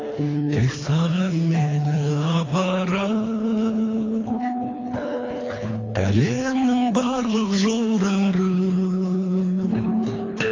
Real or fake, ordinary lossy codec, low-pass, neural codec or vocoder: fake; AAC, 32 kbps; 7.2 kHz; codec, 24 kHz, 3 kbps, HILCodec